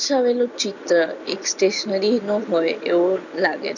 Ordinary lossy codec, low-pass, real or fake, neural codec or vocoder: none; 7.2 kHz; real; none